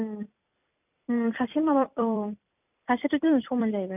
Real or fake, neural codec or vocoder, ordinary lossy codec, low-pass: fake; vocoder, 44.1 kHz, 128 mel bands every 256 samples, BigVGAN v2; none; 3.6 kHz